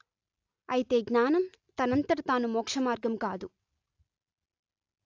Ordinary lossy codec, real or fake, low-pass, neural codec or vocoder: AAC, 48 kbps; real; 7.2 kHz; none